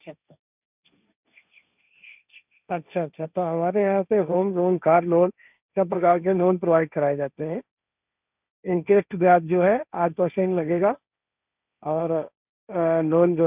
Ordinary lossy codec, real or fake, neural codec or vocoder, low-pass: none; fake; codec, 16 kHz, 1.1 kbps, Voila-Tokenizer; 3.6 kHz